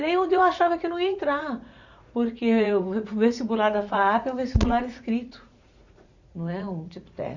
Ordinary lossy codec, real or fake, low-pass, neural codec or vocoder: none; fake; 7.2 kHz; vocoder, 44.1 kHz, 80 mel bands, Vocos